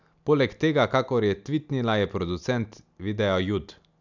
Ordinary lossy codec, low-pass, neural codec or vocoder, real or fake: none; 7.2 kHz; none; real